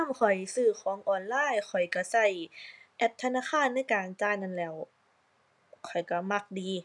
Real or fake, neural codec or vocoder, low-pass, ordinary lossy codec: real; none; 10.8 kHz; none